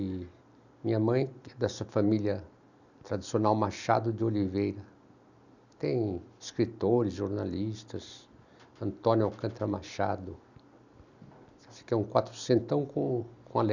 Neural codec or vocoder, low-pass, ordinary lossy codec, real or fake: none; 7.2 kHz; none; real